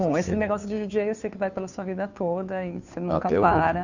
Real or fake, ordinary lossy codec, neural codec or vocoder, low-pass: fake; none; codec, 16 kHz, 2 kbps, FunCodec, trained on Chinese and English, 25 frames a second; 7.2 kHz